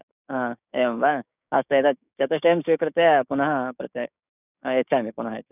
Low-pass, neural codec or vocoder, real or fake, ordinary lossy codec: 3.6 kHz; codec, 16 kHz, 6 kbps, DAC; fake; none